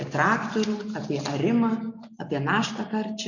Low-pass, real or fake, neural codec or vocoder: 7.2 kHz; real; none